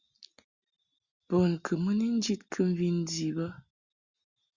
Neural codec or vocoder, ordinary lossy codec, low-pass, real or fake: none; Opus, 64 kbps; 7.2 kHz; real